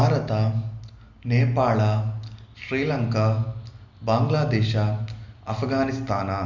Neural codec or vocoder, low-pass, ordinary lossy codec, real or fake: none; 7.2 kHz; none; real